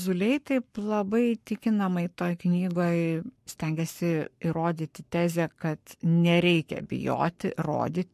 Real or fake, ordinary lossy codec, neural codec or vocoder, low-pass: fake; MP3, 64 kbps; codec, 44.1 kHz, 7.8 kbps, Pupu-Codec; 14.4 kHz